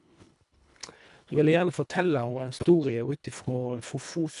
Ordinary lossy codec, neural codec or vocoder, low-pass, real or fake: none; codec, 24 kHz, 1.5 kbps, HILCodec; 10.8 kHz; fake